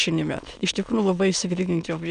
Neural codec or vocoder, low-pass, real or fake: autoencoder, 22.05 kHz, a latent of 192 numbers a frame, VITS, trained on many speakers; 9.9 kHz; fake